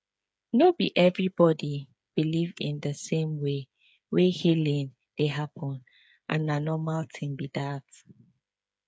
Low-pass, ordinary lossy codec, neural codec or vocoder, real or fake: none; none; codec, 16 kHz, 8 kbps, FreqCodec, smaller model; fake